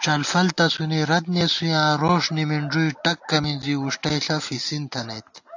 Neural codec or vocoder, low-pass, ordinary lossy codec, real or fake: none; 7.2 kHz; AAC, 48 kbps; real